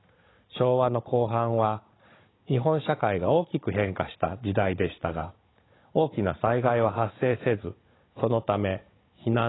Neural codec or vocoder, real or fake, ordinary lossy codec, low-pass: codec, 16 kHz, 16 kbps, FunCodec, trained on Chinese and English, 50 frames a second; fake; AAC, 16 kbps; 7.2 kHz